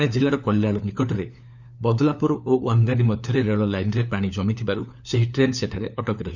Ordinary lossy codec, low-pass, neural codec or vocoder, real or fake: none; 7.2 kHz; codec, 16 kHz, 4 kbps, FunCodec, trained on LibriTTS, 50 frames a second; fake